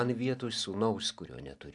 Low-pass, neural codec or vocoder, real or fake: 10.8 kHz; none; real